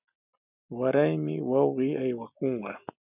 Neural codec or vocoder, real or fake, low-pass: none; real; 3.6 kHz